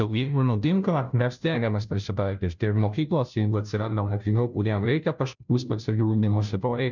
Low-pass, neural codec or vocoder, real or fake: 7.2 kHz; codec, 16 kHz, 0.5 kbps, FunCodec, trained on Chinese and English, 25 frames a second; fake